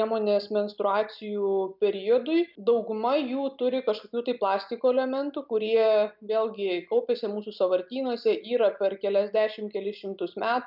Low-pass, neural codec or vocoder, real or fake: 5.4 kHz; none; real